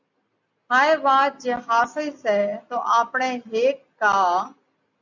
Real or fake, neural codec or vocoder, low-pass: real; none; 7.2 kHz